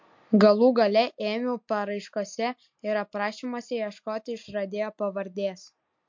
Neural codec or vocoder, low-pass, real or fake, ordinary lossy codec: none; 7.2 kHz; real; MP3, 48 kbps